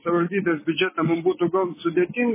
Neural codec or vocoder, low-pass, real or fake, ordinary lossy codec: codec, 16 kHz, 6 kbps, DAC; 3.6 kHz; fake; MP3, 16 kbps